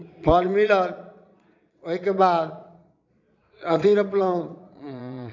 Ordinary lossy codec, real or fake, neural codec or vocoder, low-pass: none; fake; vocoder, 22.05 kHz, 80 mel bands, Vocos; 7.2 kHz